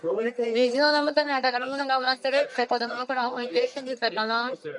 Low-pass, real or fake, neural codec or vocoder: 10.8 kHz; fake; codec, 44.1 kHz, 1.7 kbps, Pupu-Codec